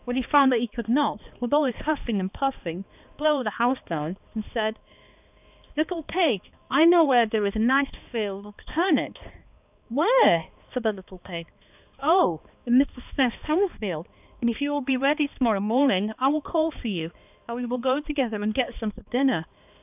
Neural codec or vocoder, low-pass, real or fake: codec, 16 kHz, 2 kbps, X-Codec, HuBERT features, trained on balanced general audio; 3.6 kHz; fake